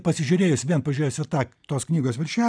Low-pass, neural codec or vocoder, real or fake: 9.9 kHz; none; real